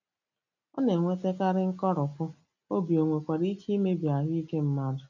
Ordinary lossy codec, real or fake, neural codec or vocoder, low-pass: none; real; none; 7.2 kHz